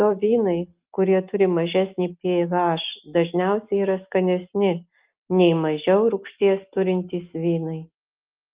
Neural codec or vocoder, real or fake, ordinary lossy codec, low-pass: none; real; Opus, 32 kbps; 3.6 kHz